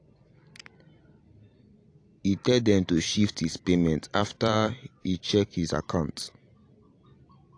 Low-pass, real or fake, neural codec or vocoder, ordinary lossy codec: 9.9 kHz; fake; vocoder, 44.1 kHz, 128 mel bands every 256 samples, BigVGAN v2; AAC, 48 kbps